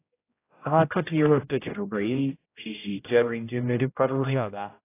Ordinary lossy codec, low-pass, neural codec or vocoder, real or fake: AAC, 24 kbps; 3.6 kHz; codec, 16 kHz, 0.5 kbps, X-Codec, HuBERT features, trained on general audio; fake